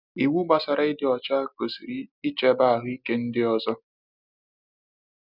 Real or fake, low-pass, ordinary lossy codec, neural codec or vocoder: real; 5.4 kHz; none; none